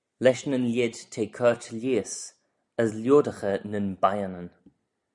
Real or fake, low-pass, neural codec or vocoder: real; 10.8 kHz; none